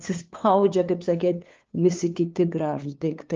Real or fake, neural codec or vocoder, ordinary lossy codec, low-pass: fake; codec, 16 kHz, 2 kbps, FunCodec, trained on LibriTTS, 25 frames a second; Opus, 24 kbps; 7.2 kHz